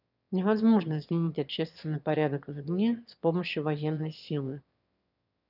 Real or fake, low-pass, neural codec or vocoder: fake; 5.4 kHz; autoencoder, 22.05 kHz, a latent of 192 numbers a frame, VITS, trained on one speaker